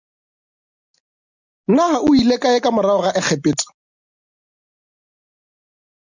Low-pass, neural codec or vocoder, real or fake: 7.2 kHz; none; real